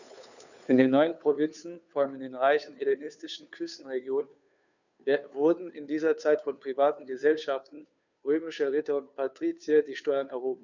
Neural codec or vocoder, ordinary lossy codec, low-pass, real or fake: codec, 16 kHz, 2 kbps, FunCodec, trained on Chinese and English, 25 frames a second; none; 7.2 kHz; fake